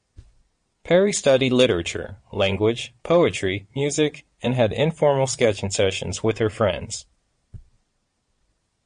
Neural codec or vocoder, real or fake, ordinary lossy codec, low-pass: vocoder, 22.05 kHz, 80 mel bands, Vocos; fake; MP3, 48 kbps; 9.9 kHz